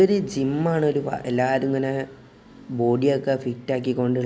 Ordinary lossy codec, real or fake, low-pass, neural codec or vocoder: none; real; none; none